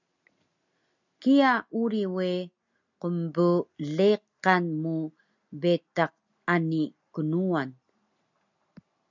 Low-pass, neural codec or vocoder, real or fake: 7.2 kHz; none; real